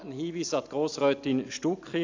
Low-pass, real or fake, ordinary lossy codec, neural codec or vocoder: 7.2 kHz; real; AAC, 48 kbps; none